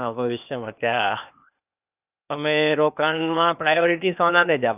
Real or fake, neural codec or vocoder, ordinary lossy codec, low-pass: fake; codec, 16 kHz, 0.8 kbps, ZipCodec; none; 3.6 kHz